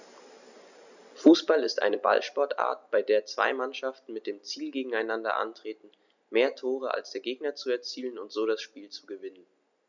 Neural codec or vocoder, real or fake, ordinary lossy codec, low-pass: none; real; none; 7.2 kHz